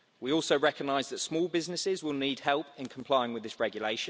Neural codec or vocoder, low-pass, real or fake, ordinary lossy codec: none; none; real; none